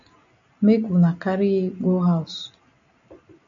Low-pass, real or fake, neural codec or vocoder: 7.2 kHz; real; none